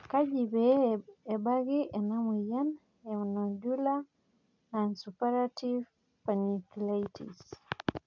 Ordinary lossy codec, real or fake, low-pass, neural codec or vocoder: none; real; 7.2 kHz; none